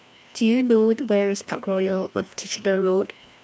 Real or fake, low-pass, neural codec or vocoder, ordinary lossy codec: fake; none; codec, 16 kHz, 1 kbps, FreqCodec, larger model; none